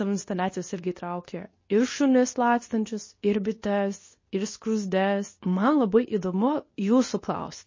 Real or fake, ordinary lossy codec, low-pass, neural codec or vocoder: fake; MP3, 32 kbps; 7.2 kHz; codec, 24 kHz, 0.9 kbps, WavTokenizer, medium speech release version 2